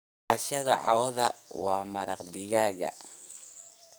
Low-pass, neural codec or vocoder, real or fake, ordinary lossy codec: none; codec, 44.1 kHz, 2.6 kbps, SNAC; fake; none